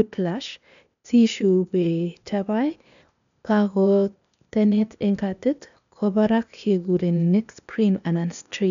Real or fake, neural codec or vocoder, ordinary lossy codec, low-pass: fake; codec, 16 kHz, 0.8 kbps, ZipCodec; none; 7.2 kHz